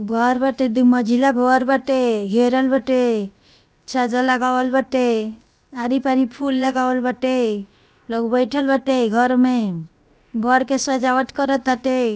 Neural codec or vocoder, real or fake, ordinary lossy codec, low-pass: codec, 16 kHz, about 1 kbps, DyCAST, with the encoder's durations; fake; none; none